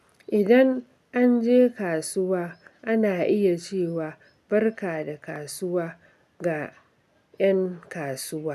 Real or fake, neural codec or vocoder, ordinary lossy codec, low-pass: real; none; none; 14.4 kHz